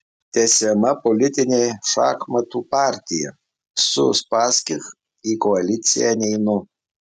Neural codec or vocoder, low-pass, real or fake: none; 14.4 kHz; real